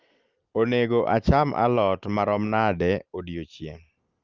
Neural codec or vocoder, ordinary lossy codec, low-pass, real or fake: none; Opus, 32 kbps; 7.2 kHz; real